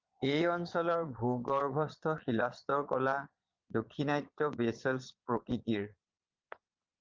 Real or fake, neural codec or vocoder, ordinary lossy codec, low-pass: fake; vocoder, 22.05 kHz, 80 mel bands, Vocos; Opus, 32 kbps; 7.2 kHz